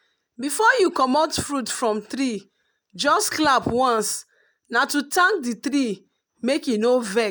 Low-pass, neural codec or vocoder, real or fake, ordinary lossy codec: none; none; real; none